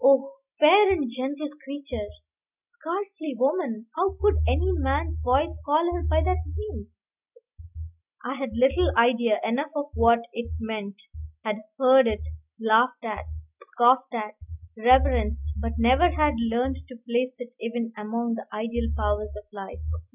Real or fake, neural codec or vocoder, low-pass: real; none; 3.6 kHz